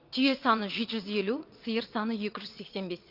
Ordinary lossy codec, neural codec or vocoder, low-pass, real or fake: Opus, 32 kbps; vocoder, 22.05 kHz, 80 mel bands, WaveNeXt; 5.4 kHz; fake